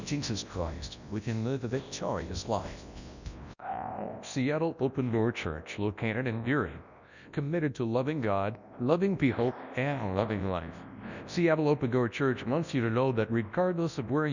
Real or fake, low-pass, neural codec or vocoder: fake; 7.2 kHz; codec, 24 kHz, 0.9 kbps, WavTokenizer, large speech release